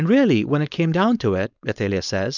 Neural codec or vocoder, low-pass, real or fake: codec, 16 kHz, 4.8 kbps, FACodec; 7.2 kHz; fake